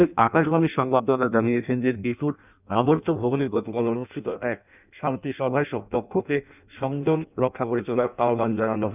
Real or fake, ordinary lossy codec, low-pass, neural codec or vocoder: fake; none; 3.6 kHz; codec, 16 kHz in and 24 kHz out, 0.6 kbps, FireRedTTS-2 codec